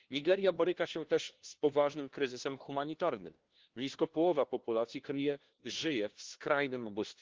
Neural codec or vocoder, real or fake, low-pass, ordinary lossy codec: codec, 16 kHz, 1 kbps, FunCodec, trained on LibriTTS, 50 frames a second; fake; 7.2 kHz; Opus, 16 kbps